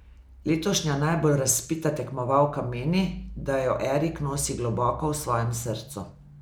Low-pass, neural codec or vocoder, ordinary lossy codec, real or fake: none; none; none; real